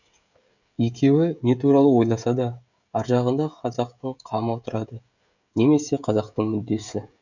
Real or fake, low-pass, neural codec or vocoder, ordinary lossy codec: fake; 7.2 kHz; codec, 16 kHz, 16 kbps, FreqCodec, smaller model; none